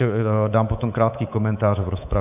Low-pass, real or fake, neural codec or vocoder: 3.6 kHz; fake; codec, 24 kHz, 3.1 kbps, DualCodec